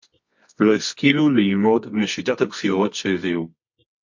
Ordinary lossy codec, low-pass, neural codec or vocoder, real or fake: MP3, 48 kbps; 7.2 kHz; codec, 24 kHz, 0.9 kbps, WavTokenizer, medium music audio release; fake